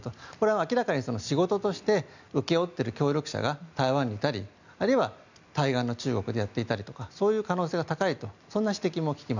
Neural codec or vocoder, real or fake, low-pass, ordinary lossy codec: none; real; 7.2 kHz; none